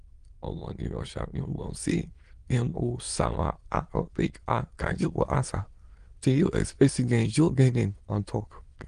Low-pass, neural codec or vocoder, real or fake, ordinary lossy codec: 9.9 kHz; autoencoder, 22.05 kHz, a latent of 192 numbers a frame, VITS, trained on many speakers; fake; Opus, 24 kbps